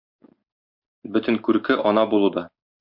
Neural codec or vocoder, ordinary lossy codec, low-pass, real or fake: none; MP3, 48 kbps; 5.4 kHz; real